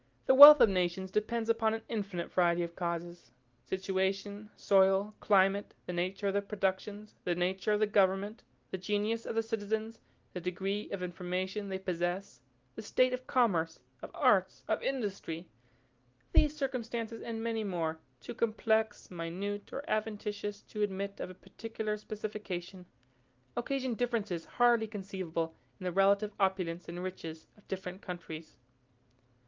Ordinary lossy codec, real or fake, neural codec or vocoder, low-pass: Opus, 24 kbps; real; none; 7.2 kHz